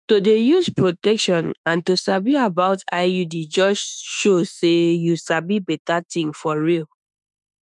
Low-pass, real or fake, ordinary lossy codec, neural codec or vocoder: 10.8 kHz; fake; none; autoencoder, 48 kHz, 32 numbers a frame, DAC-VAE, trained on Japanese speech